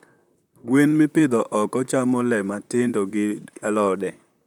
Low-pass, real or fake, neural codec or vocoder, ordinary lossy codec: 19.8 kHz; fake; vocoder, 44.1 kHz, 128 mel bands, Pupu-Vocoder; none